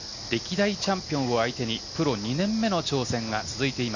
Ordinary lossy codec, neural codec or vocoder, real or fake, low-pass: none; none; real; 7.2 kHz